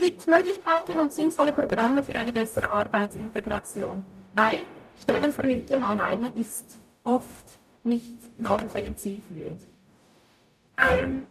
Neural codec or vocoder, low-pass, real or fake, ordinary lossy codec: codec, 44.1 kHz, 0.9 kbps, DAC; 14.4 kHz; fake; none